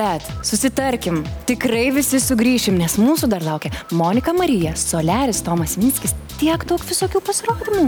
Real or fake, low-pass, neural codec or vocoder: real; 19.8 kHz; none